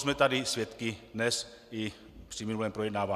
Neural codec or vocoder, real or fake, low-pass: none; real; 14.4 kHz